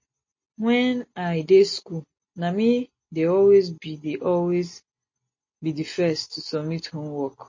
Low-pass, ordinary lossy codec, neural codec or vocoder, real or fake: 7.2 kHz; MP3, 32 kbps; none; real